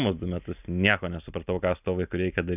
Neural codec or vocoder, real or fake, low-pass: none; real; 3.6 kHz